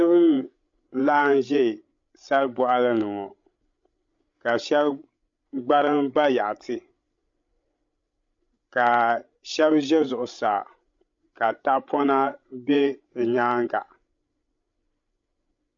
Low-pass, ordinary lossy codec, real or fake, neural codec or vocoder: 7.2 kHz; MP3, 48 kbps; fake; codec, 16 kHz, 16 kbps, FreqCodec, larger model